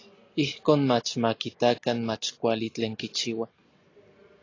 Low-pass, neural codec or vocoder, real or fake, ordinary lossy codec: 7.2 kHz; none; real; AAC, 32 kbps